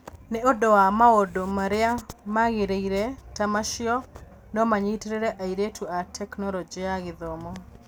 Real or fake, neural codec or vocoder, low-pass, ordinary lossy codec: real; none; none; none